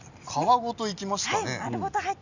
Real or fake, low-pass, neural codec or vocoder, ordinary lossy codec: real; 7.2 kHz; none; none